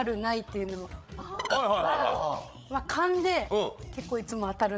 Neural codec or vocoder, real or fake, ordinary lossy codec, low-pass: codec, 16 kHz, 8 kbps, FreqCodec, larger model; fake; none; none